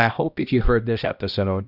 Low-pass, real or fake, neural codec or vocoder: 5.4 kHz; fake; codec, 16 kHz, 1 kbps, X-Codec, HuBERT features, trained on balanced general audio